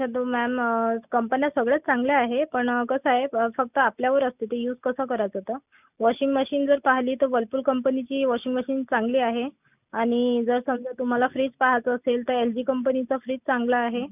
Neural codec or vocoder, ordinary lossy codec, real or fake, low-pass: none; none; real; 3.6 kHz